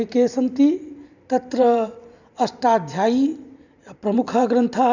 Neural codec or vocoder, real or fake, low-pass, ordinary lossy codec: vocoder, 44.1 kHz, 128 mel bands every 512 samples, BigVGAN v2; fake; 7.2 kHz; none